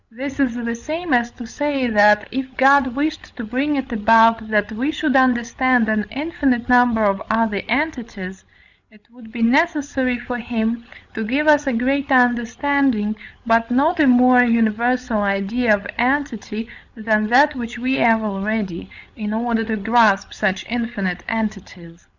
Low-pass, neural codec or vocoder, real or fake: 7.2 kHz; codec, 16 kHz, 16 kbps, FreqCodec, larger model; fake